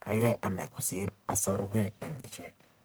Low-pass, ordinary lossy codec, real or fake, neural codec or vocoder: none; none; fake; codec, 44.1 kHz, 1.7 kbps, Pupu-Codec